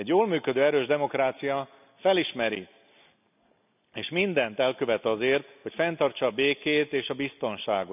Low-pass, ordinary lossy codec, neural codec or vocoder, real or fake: 3.6 kHz; none; none; real